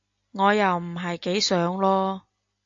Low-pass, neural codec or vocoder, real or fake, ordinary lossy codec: 7.2 kHz; none; real; AAC, 64 kbps